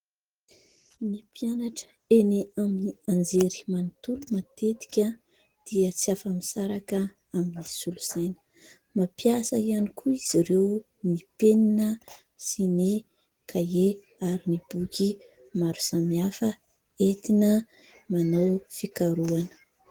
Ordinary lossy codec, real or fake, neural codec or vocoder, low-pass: Opus, 16 kbps; real; none; 19.8 kHz